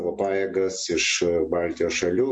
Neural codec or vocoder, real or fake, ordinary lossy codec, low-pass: none; real; MP3, 48 kbps; 9.9 kHz